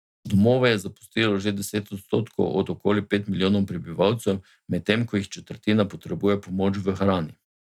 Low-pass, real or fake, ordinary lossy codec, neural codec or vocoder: 19.8 kHz; real; none; none